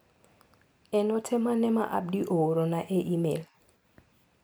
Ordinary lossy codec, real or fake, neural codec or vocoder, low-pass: none; real; none; none